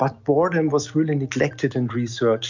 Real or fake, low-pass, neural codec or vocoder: real; 7.2 kHz; none